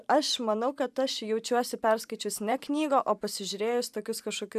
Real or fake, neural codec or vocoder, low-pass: fake; vocoder, 44.1 kHz, 128 mel bands every 512 samples, BigVGAN v2; 14.4 kHz